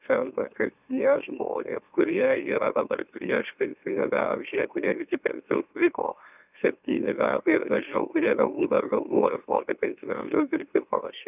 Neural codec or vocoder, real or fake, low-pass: autoencoder, 44.1 kHz, a latent of 192 numbers a frame, MeloTTS; fake; 3.6 kHz